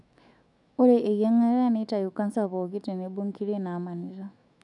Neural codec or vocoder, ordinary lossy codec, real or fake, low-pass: autoencoder, 48 kHz, 128 numbers a frame, DAC-VAE, trained on Japanese speech; none; fake; 10.8 kHz